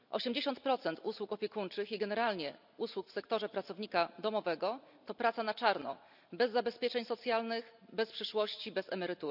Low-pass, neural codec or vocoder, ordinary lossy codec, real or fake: 5.4 kHz; none; none; real